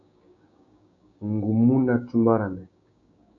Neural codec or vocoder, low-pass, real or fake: codec, 16 kHz, 6 kbps, DAC; 7.2 kHz; fake